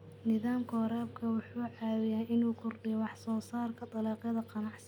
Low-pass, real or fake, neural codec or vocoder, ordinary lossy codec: 19.8 kHz; real; none; none